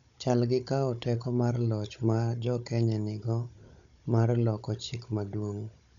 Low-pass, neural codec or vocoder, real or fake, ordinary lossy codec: 7.2 kHz; codec, 16 kHz, 16 kbps, FunCodec, trained on Chinese and English, 50 frames a second; fake; none